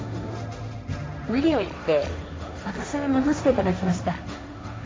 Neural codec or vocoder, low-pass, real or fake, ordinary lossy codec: codec, 16 kHz, 1.1 kbps, Voila-Tokenizer; none; fake; none